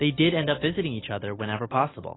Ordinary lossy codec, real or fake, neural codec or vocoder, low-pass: AAC, 16 kbps; real; none; 7.2 kHz